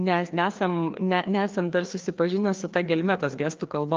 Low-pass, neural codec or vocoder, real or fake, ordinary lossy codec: 7.2 kHz; codec, 16 kHz, 2 kbps, FreqCodec, larger model; fake; Opus, 24 kbps